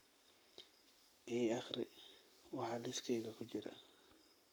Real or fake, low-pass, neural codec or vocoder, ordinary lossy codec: fake; none; codec, 44.1 kHz, 7.8 kbps, Pupu-Codec; none